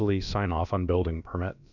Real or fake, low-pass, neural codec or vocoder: fake; 7.2 kHz; codec, 16 kHz, about 1 kbps, DyCAST, with the encoder's durations